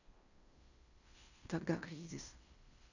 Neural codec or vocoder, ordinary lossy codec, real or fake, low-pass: codec, 16 kHz in and 24 kHz out, 0.9 kbps, LongCat-Audio-Codec, fine tuned four codebook decoder; none; fake; 7.2 kHz